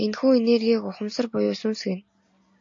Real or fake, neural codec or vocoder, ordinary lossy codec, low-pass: real; none; AAC, 48 kbps; 7.2 kHz